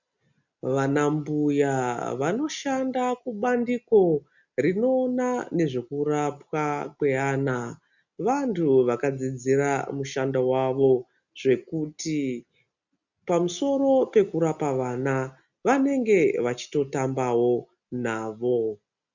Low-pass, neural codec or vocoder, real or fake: 7.2 kHz; none; real